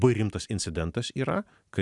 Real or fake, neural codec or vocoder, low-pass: real; none; 10.8 kHz